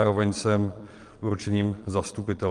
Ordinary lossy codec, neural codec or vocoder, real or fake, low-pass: Opus, 24 kbps; vocoder, 22.05 kHz, 80 mel bands, Vocos; fake; 9.9 kHz